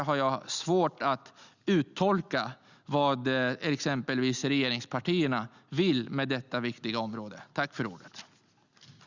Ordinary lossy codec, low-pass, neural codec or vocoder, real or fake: Opus, 64 kbps; 7.2 kHz; none; real